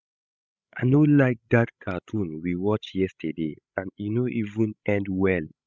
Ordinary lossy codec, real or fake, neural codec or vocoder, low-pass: none; fake; codec, 16 kHz, 16 kbps, FreqCodec, larger model; none